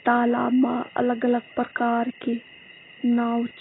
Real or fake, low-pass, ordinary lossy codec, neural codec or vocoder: real; 7.2 kHz; AAC, 16 kbps; none